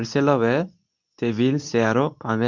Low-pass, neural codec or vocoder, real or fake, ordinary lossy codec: 7.2 kHz; codec, 24 kHz, 0.9 kbps, WavTokenizer, medium speech release version 2; fake; none